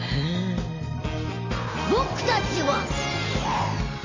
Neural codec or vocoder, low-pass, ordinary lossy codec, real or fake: none; 7.2 kHz; MP3, 32 kbps; real